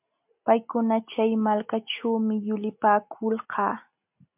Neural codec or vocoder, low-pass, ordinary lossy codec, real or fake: none; 3.6 kHz; MP3, 32 kbps; real